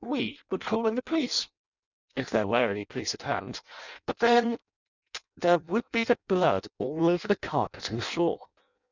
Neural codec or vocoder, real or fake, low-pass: codec, 16 kHz in and 24 kHz out, 0.6 kbps, FireRedTTS-2 codec; fake; 7.2 kHz